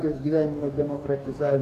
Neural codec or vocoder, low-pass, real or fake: codec, 44.1 kHz, 2.6 kbps, DAC; 14.4 kHz; fake